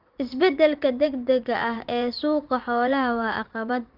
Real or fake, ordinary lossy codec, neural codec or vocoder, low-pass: fake; Opus, 32 kbps; vocoder, 44.1 kHz, 80 mel bands, Vocos; 5.4 kHz